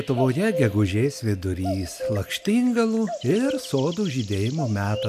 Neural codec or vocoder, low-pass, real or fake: none; 14.4 kHz; real